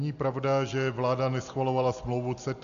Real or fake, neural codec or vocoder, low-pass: real; none; 7.2 kHz